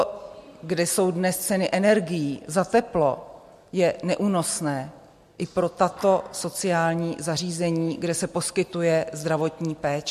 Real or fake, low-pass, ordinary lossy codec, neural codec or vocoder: real; 14.4 kHz; MP3, 64 kbps; none